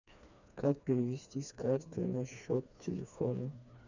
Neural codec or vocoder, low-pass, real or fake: codec, 16 kHz, 2 kbps, FreqCodec, smaller model; 7.2 kHz; fake